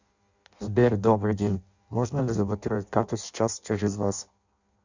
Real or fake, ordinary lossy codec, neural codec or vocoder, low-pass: fake; Opus, 64 kbps; codec, 16 kHz in and 24 kHz out, 0.6 kbps, FireRedTTS-2 codec; 7.2 kHz